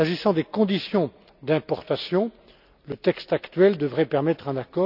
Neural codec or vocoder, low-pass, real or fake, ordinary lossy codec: none; 5.4 kHz; real; none